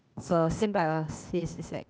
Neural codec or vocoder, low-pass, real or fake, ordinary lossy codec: codec, 16 kHz, 0.8 kbps, ZipCodec; none; fake; none